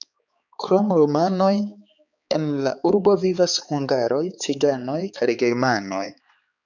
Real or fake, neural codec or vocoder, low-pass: fake; codec, 16 kHz, 4 kbps, X-Codec, HuBERT features, trained on balanced general audio; 7.2 kHz